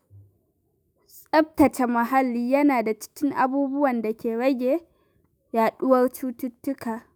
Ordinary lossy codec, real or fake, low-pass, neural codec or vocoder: none; fake; none; autoencoder, 48 kHz, 128 numbers a frame, DAC-VAE, trained on Japanese speech